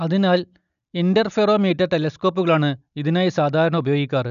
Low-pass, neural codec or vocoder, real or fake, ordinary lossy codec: 7.2 kHz; none; real; none